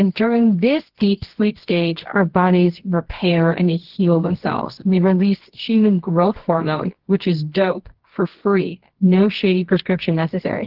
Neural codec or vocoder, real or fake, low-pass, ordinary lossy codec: codec, 24 kHz, 0.9 kbps, WavTokenizer, medium music audio release; fake; 5.4 kHz; Opus, 16 kbps